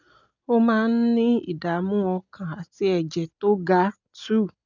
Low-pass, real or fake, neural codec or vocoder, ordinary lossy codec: 7.2 kHz; real; none; none